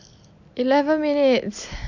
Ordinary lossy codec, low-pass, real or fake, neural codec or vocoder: none; 7.2 kHz; real; none